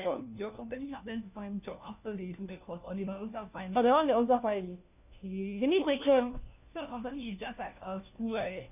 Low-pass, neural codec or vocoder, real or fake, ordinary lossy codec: 3.6 kHz; codec, 16 kHz, 1 kbps, FunCodec, trained on LibriTTS, 50 frames a second; fake; none